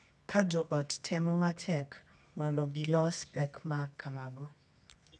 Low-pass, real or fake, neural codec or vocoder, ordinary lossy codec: none; fake; codec, 24 kHz, 0.9 kbps, WavTokenizer, medium music audio release; none